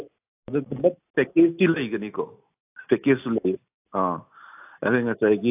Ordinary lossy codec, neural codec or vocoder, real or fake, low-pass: AAC, 32 kbps; none; real; 3.6 kHz